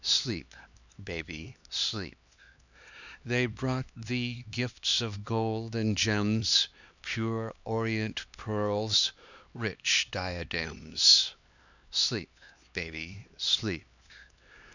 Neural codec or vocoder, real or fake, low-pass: codec, 16 kHz, 2 kbps, X-Codec, HuBERT features, trained on LibriSpeech; fake; 7.2 kHz